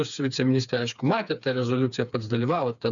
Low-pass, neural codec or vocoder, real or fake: 7.2 kHz; codec, 16 kHz, 4 kbps, FreqCodec, smaller model; fake